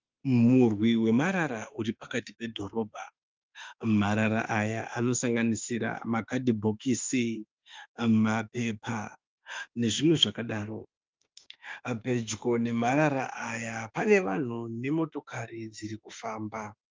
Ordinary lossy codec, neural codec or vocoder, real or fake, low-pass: Opus, 32 kbps; autoencoder, 48 kHz, 32 numbers a frame, DAC-VAE, trained on Japanese speech; fake; 7.2 kHz